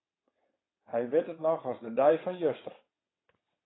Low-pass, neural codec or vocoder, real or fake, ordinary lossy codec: 7.2 kHz; vocoder, 22.05 kHz, 80 mel bands, WaveNeXt; fake; AAC, 16 kbps